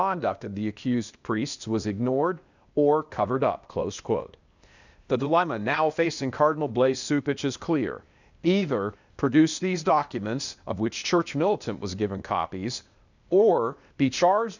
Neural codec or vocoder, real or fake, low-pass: codec, 16 kHz, 0.8 kbps, ZipCodec; fake; 7.2 kHz